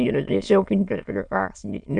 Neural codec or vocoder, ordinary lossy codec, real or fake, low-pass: autoencoder, 22.05 kHz, a latent of 192 numbers a frame, VITS, trained on many speakers; Opus, 64 kbps; fake; 9.9 kHz